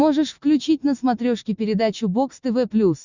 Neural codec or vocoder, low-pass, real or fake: none; 7.2 kHz; real